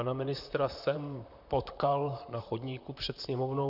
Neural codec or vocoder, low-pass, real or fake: vocoder, 44.1 kHz, 128 mel bands, Pupu-Vocoder; 5.4 kHz; fake